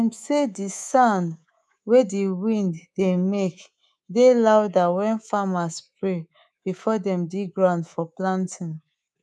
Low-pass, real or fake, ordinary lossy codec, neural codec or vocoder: none; fake; none; codec, 24 kHz, 3.1 kbps, DualCodec